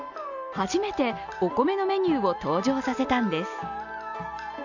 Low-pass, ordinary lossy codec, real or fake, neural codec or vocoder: 7.2 kHz; none; real; none